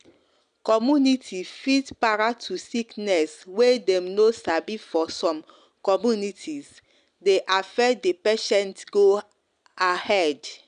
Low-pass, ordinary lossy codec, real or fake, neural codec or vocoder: 9.9 kHz; none; real; none